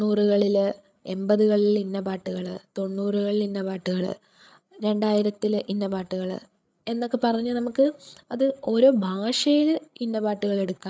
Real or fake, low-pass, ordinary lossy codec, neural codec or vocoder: fake; none; none; codec, 16 kHz, 8 kbps, FreqCodec, larger model